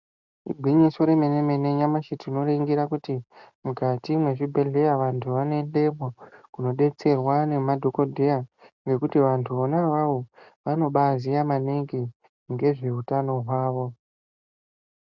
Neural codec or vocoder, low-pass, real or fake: none; 7.2 kHz; real